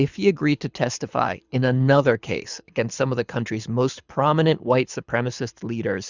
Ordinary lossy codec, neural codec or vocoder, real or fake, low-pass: Opus, 64 kbps; codec, 24 kHz, 6 kbps, HILCodec; fake; 7.2 kHz